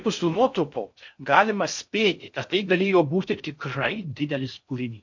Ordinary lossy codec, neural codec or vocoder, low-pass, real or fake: MP3, 48 kbps; codec, 16 kHz in and 24 kHz out, 0.6 kbps, FocalCodec, streaming, 2048 codes; 7.2 kHz; fake